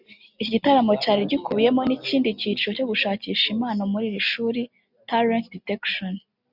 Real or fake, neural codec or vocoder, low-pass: real; none; 5.4 kHz